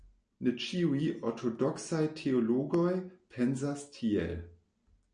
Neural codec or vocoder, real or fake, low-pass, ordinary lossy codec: none; real; 9.9 kHz; MP3, 48 kbps